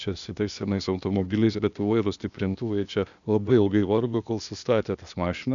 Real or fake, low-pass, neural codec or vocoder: fake; 7.2 kHz; codec, 16 kHz, 0.8 kbps, ZipCodec